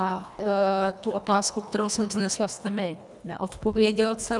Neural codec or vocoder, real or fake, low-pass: codec, 24 kHz, 1.5 kbps, HILCodec; fake; 10.8 kHz